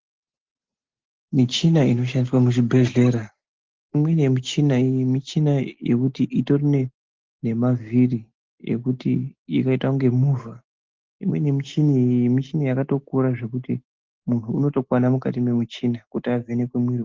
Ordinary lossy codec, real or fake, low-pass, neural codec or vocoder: Opus, 16 kbps; real; 7.2 kHz; none